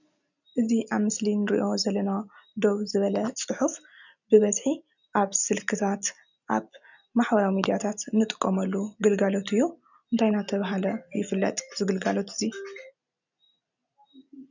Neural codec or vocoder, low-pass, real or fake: none; 7.2 kHz; real